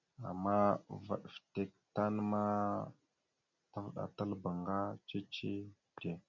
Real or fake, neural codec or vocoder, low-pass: real; none; 7.2 kHz